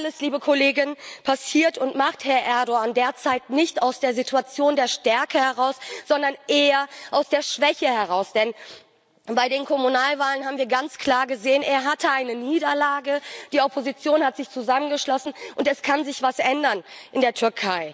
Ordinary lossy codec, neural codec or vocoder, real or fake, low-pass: none; none; real; none